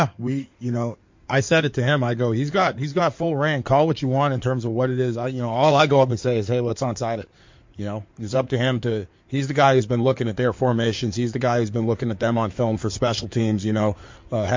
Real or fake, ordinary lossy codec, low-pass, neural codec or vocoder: fake; MP3, 48 kbps; 7.2 kHz; codec, 16 kHz in and 24 kHz out, 2.2 kbps, FireRedTTS-2 codec